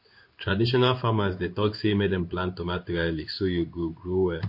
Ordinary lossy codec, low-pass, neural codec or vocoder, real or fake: MP3, 32 kbps; 5.4 kHz; codec, 16 kHz in and 24 kHz out, 1 kbps, XY-Tokenizer; fake